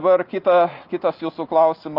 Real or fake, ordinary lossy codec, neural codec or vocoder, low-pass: real; Opus, 24 kbps; none; 5.4 kHz